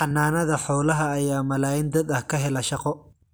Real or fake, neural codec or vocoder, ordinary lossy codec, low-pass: real; none; none; none